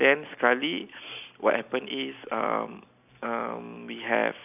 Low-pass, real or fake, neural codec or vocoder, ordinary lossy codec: 3.6 kHz; real; none; none